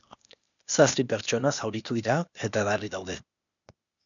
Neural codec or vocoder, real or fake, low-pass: codec, 16 kHz, 0.8 kbps, ZipCodec; fake; 7.2 kHz